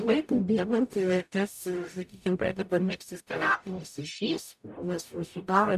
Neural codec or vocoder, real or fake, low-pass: codec, 44.1 kHz, 0.9 kbps, DAC; fake; 14.4 kHz